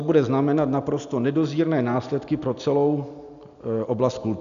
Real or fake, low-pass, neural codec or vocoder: real; 7.2 kHz; none